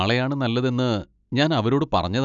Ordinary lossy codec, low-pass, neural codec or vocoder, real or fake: none; 7.2 kHz; none; real